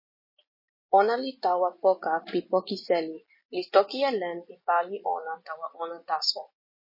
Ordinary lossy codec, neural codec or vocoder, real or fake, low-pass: MP3, 24 kbps; none; real; 5.4 kHz